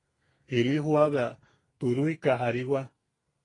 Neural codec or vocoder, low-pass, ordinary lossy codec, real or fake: codec, 32 kHz, 1.9 kbps, SNAC; 10.8 kHz; AAC, 32 kbps; fake